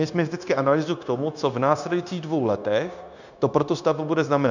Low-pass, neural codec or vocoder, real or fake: 7.2 kHz; codec, 16 kHz, 0.9 kbps, LongCat-Audio-Codec; fake